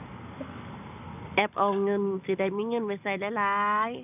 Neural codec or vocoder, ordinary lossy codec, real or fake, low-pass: none; none; real; 3.6 kHz